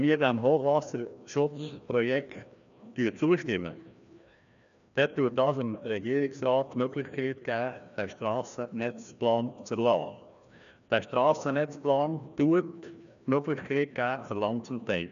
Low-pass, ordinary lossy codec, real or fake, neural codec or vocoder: 7.2 kHz; MP3, 96 kbps; fake; codec, 16 kHz, 1 kbps, FreqCodec, larger model